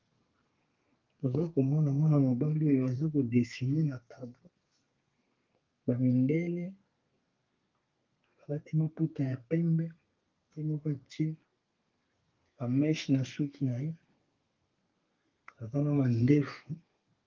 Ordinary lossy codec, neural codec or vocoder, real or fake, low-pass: Opus, 32 kbps; codec, 32 kHz, 1.9 kbps, SNAC; fake; 7.2 kHz